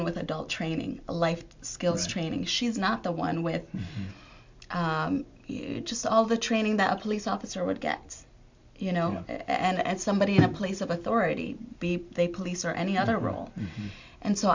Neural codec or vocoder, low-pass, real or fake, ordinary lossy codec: none; 7.2 kHz; real; MP3, 64 kbps